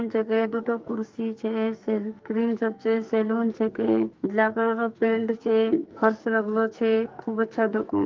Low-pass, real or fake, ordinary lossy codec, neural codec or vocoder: 7.2 kHz; fake; Opus, 32 kbps; codec, 44.1 kHz, 2.6 kbps, SNAC